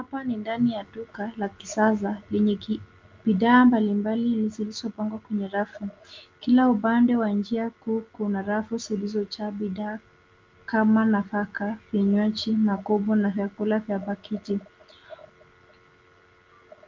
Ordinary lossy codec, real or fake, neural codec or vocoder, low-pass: Opus, 32 kbps; real; none; 7.2 kHz